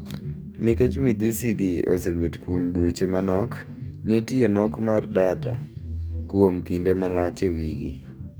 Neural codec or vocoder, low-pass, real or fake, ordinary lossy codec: codec, 44.1 kHz, 2.6 kbps, DAC; none; fake; none